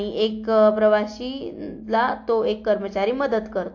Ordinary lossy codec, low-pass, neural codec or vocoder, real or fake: AAC, 48 kbps; 7.2 kHz; none; real